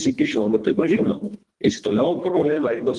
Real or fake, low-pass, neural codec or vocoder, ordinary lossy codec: fake; 10.8 kHz; codec, 24 kHz, 1.5 kbps, HILCodec; Opus, 24 kbps